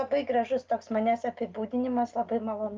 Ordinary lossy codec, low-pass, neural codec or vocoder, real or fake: Opus, 24 kbps; 7.2 kHz; none; real